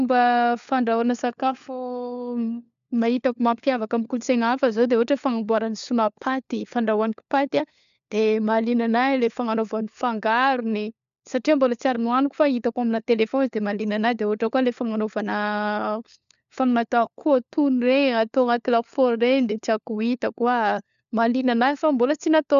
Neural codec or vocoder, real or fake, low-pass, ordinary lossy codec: codec, 16 kHz, 4 kbps, FunCodec, trained on LibriTTS, 50 frames a second; fake; 7.2 kHz; MP3, 96 kbps